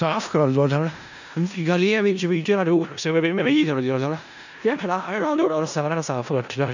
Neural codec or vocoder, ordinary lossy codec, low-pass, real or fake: codec, 16 kHz in and 24 kHz out, 0.4 kbps, LongCat-Audio-Codec, four codebook decoder; none; 7.2 kHz; fake